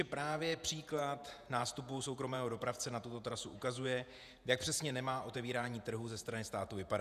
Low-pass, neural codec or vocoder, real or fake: 14.4 kHz; vocoder, 48 kHz, 128 mel bands, Vocos; fake